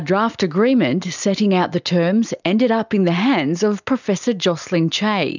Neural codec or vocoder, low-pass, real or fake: none; 7.2 kHz; real